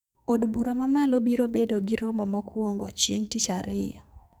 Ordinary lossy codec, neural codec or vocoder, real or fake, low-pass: none; codec, 44.1 kHz, 2.6 kbps, SNAC; fake; none